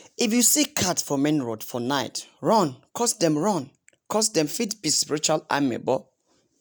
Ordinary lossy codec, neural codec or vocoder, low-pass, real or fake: none; none; none; real